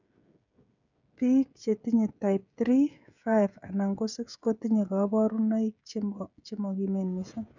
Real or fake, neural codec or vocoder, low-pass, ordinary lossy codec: fake; codec, 16 kHz, 16 kbps, FreqCodec, smaller model; 7.2 kHz; none